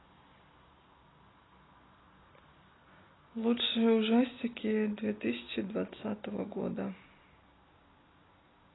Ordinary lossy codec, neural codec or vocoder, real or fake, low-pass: AAC, 16 kbps; none; real; 7.2 kHz